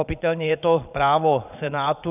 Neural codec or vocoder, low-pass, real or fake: vocoder, 22.05 kHz, 80 mel bands, Vocos; 3.6 kHz; fake